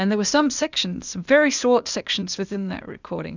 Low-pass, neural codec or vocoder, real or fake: 7.2 kHz; codec, 16 kHz, 0.8 kbps, ZipCodec; fake